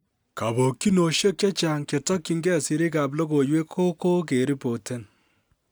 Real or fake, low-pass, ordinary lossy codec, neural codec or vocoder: real; none; none; none